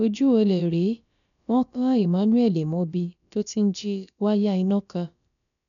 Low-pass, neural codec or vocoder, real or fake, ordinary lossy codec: 7.2 kHz; codec, 16 kHz, about 1 kbps, DyCAST, with the encoder's durations; fake; none